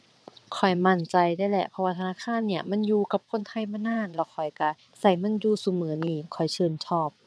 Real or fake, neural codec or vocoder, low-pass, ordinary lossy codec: fake; vocoder, 22.05 kHz, 80 mel bands, WaveNeXt; none; none